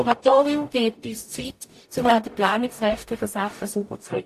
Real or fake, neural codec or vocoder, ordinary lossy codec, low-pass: fake; codec, 44.1 kHz, 0.9 kbps, DAC; AAC, 64 kbps; 14.4 kHz